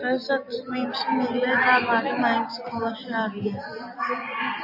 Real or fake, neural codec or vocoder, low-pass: real; none; 5.4 kHz